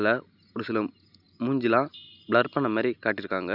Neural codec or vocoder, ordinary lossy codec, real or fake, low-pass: none; none; real; 5.4 kHz